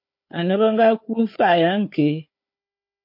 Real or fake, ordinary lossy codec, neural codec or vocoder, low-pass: fake; MP3, 32 kbps; codec, 16 kHz, 4 kbps, FunCodec, trained on Chinese and English, 50 frames a second; 5.4 kHz